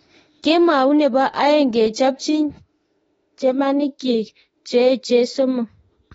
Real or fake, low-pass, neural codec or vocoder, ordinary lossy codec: fake; 19.8 kHz; autoencoder, 48 kHz, 32 numbers a frame, DAC-VAE, trained on Japanese speech; AAC, 24 kbps